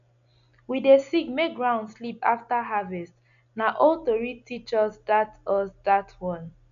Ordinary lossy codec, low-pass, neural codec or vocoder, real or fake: none; 7.2 kHz; none; real